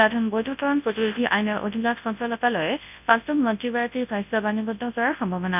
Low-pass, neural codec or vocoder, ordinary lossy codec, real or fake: 3.6 kHz; codec, 24 kHz, 0.9 kbps, WavTokenizer, large speech release; none; fake